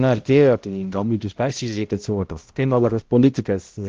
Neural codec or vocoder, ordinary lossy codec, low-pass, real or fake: codec, 16 kHz, 0.5 kbps, X-Codec, HuBERT features, trained on balanced general audio; Opus, 16 kbps; 7.2 kHz; fake